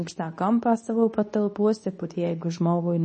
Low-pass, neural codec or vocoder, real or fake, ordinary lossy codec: 10.8 kHz; codec, 24 kHz, 0.9 kbps, WavTokenizer, medium speech release version 2; fake; MP3, 32 kbps